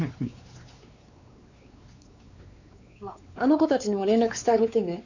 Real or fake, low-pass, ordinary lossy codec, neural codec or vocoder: fake; 7.2 kHz; none; codec, 16 kHz, 4 kbps, X-Codec, WavLM features, trained on Multilingual LibriSpeech